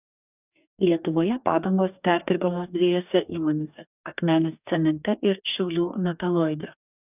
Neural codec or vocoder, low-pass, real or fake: codec, 44.1 kHz, 2.6 kbps, DAC; 3.6 kHz; fake